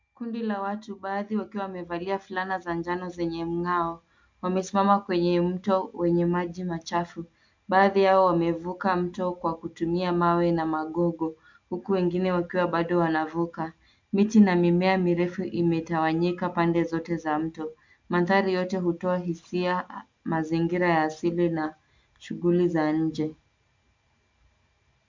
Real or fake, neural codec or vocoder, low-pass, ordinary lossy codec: real; none; 7.2 kHz; MP3, 64 kbps